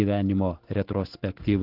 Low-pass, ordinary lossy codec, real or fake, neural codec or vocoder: 5.4 kHz; Opus, 16 kbps; real; none